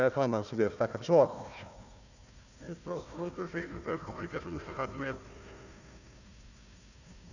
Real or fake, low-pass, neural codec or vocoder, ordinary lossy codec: fake; 7.2 kHz; codec, 16 kHz, 1 kbps, FunCodec, trained on Chinese and English, 50 frames a second; none